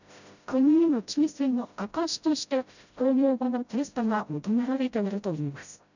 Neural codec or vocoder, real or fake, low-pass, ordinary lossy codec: codec, 16 kHz, 0.5 kbps, FreqCodec, smaller model; fake; 7.2 kHz; none